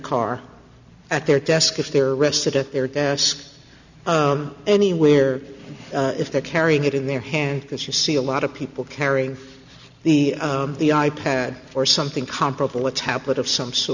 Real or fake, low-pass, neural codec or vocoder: real; 7.2 kHz; none